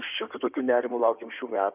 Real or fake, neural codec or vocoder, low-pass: fake; codec, 16 kHz in and 24 kHz out, 2.2 kbps, FireRedTTS-2 codec; 3.6 kHz